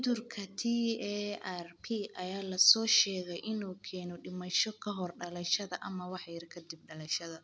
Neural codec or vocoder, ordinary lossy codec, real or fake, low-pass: none; none; real; none